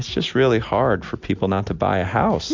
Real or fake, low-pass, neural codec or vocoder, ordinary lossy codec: real; 7.2 kHz; none; MP3, 64 kbps